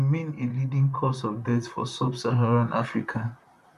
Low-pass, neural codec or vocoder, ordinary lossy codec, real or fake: 14.4 kHz; vocoder, 44.1 kHz, 128 mel bands, Pupu-Vocoder; none; fake